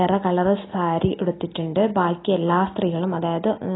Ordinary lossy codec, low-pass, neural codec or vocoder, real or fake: AAC, 16 kbps; 7.2 kHz; none; real